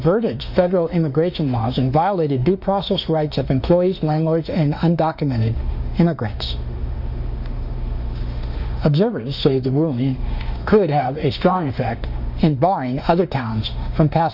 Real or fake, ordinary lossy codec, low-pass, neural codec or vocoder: fake; Opus, 64 kbps; 5.4 kHz; autoencoder, 48 kHz, 32 numbers a frame, DAC-VAE, trained on Japanese speech